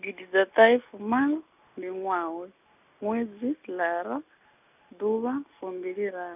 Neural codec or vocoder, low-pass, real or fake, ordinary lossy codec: none; 3.6 kHz; real; none